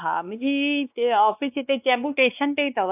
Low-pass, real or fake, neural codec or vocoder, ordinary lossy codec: 3.6 kHz; fake; codec, 16 kHz, 2 kbps, X-Codec, WavLM features, trained on Multilingual LibriSpeech; AAC, 32 kbps